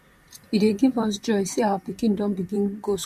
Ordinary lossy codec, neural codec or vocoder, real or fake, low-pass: MP3, 64 kbps; vocoder, 44.1 kHz, 128 mel bands, Pupu-Vocoder; fake; 14.4 kHz